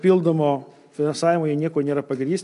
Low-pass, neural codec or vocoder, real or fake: 10.8 kHz; none; real